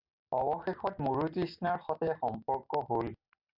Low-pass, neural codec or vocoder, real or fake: 5.4 kHz; none; real